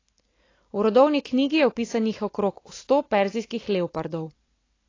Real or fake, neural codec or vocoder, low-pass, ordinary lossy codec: real; none; 7.2 kHz; AAC, 32 kbps